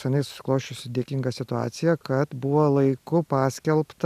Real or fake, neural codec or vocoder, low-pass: real; none; 14.4 kHz